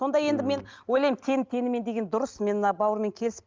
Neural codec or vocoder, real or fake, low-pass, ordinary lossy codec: none; real; 7.2 kHz; Opus, 32 kbps